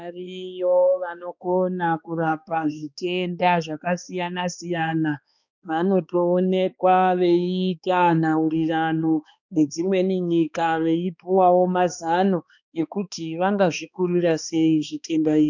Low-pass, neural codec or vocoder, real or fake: 7.2 kHz; codec, 16 kHz, 2 kbps, X-Codec, HuBERT features, trained on balanced general audio; fake